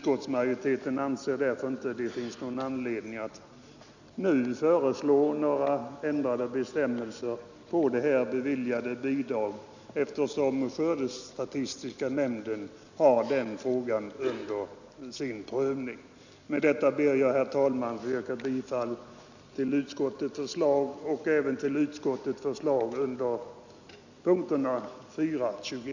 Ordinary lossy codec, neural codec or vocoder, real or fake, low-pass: none; none; real; 7.2 kHz